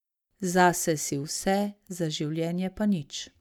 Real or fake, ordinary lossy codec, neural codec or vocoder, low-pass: real; none; none; 19.8 kHz